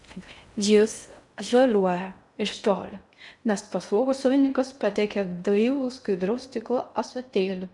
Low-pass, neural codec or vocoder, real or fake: 10.8 kHz; codec, 16 kHz in and 24 kHz out, 0.6 kbps, FocalCodec, streaming, 2048 codes; fake